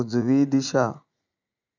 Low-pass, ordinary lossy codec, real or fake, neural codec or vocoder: 7.2 kHz; none; fake; vocoder, 44.1 kHz, 80 mel bands, Vocos